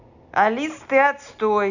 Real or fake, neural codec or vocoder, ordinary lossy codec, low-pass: real; none; none; 7.2 kHz